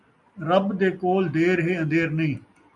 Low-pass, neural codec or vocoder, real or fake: 10.8 kHz; none; real